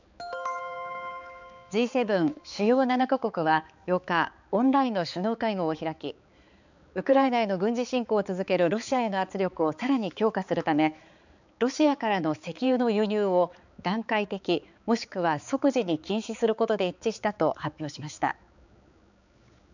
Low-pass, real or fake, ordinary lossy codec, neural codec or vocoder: 7.2 kHz; fake; none; codec, 16 kHz, 4 kbps, X-Codec, HuBERT features, trained on balanced general audio